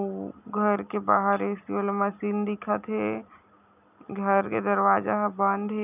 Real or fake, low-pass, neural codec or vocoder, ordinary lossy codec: real; 3.6 kHz; none; none